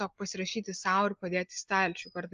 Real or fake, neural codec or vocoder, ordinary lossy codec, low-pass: real; none; Opus, 32 kbps; 7.2 kHz